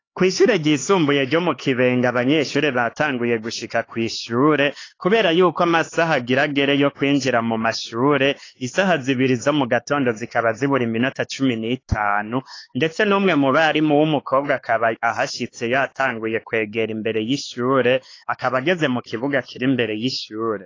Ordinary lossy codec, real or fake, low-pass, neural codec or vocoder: AAC, 32 kbps; fake; 7.2 kHz; codec, 16 kHz, 4 kbps, X-Codec, HuBERT features, trained on LibriSpeech